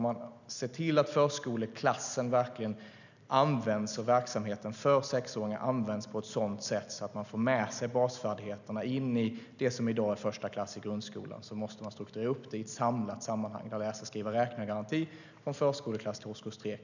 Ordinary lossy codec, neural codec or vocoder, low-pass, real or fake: none; none; 7.2 kHz; real